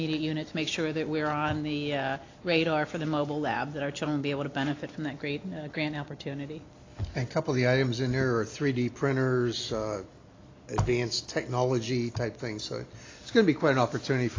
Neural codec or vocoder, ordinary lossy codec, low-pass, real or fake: none; AAC, 32 kbps; 7.2 kHz; real